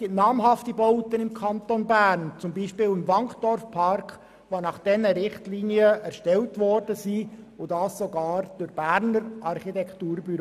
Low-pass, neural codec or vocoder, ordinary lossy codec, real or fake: 14.4 kHz; none; none; real